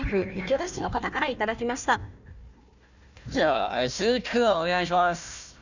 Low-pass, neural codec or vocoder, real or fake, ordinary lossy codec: 7.2 kHz; codec, 16 kHz, 1 kbps, FunCodec, trained on Chinese and English, 50 frames a second; fake; none